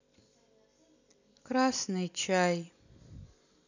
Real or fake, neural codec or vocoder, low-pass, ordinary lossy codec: real; none; 7.2 kHz; none